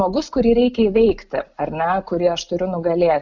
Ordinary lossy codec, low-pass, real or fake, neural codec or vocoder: Opus, 64 kbps; 7.2 kHz; real; none